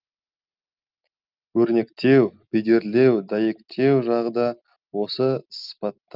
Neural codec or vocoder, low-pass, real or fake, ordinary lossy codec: none; 5.4 kHz; real; Opus, 24 kbps